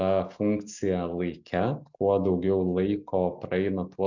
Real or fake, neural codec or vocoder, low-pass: real; none; 7.2 kHz